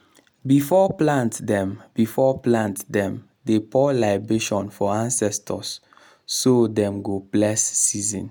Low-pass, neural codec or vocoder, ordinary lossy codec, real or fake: none; none; none; real